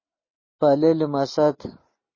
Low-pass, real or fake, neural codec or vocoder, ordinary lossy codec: 7.2 kHz; real; none; MP3, 32 kbps